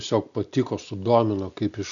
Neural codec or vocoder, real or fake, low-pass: none; real; 7.2 kHz